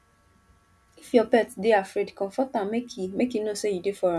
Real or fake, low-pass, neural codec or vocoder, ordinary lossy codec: real; none; none; none